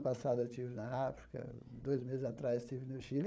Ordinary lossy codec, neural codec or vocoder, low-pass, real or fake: none; codec, 16 kHz, 8 kbps, FreqCodec, larger model; none; fake